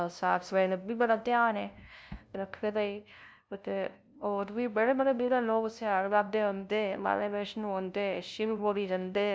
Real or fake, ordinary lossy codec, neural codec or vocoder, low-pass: fake; none; codec, 16 kHz, 0.5 kbps, FunCodec, trained on LibriTTS, 25 frames a second; none